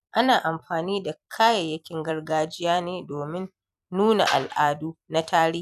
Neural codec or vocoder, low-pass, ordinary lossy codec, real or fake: vocoder, 44.1 kHz, 128 mel bands every 256 samples, BigVGAN v2; 14.4 kHz; none; fake